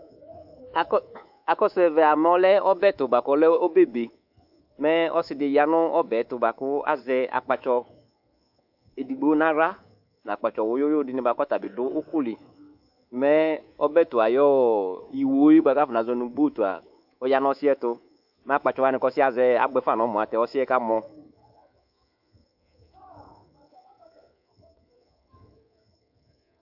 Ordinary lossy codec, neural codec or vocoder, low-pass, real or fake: AAC, 48 kbps; codec, 24 kHz, 3.1 kbps, DualCodec; 5.4 kHz; fake